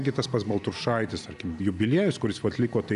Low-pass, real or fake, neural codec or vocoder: 10.8 kHz; real; none